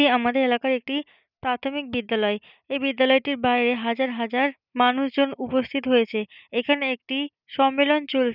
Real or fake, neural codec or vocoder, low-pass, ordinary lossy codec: real; none; 5.4 kHz; none